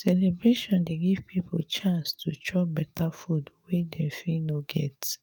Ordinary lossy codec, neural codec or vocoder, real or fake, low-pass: none; codec, 44.1 kHz, 7.8 kbps, DAC; fake; 19.8 kHz